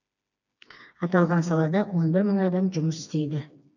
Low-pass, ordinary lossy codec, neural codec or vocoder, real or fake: 7.2 kHz; none; codec, 16 kHz, 2 kbps, FreqCodec, smaller model; fake